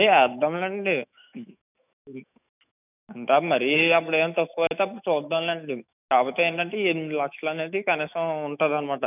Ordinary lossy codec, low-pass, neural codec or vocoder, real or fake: none; 3.6 kHz; autoencoder, 48 kHz, 128 numbers a frame, DAC-VAE, trained on Japanese speech; fake